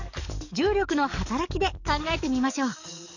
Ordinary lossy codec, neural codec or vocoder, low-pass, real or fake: none; codec, 44.1 kHz, 7.8 kbps, DAC; 7.2 kHz; fake